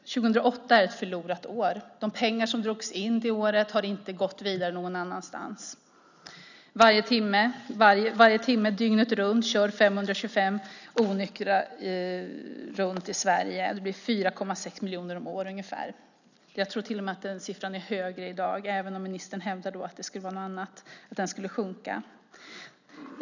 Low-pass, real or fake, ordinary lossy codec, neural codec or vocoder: 7.2 kHz; real; none; none